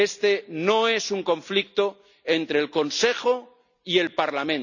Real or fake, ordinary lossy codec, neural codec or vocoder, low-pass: real; none; none; 7.2 kHz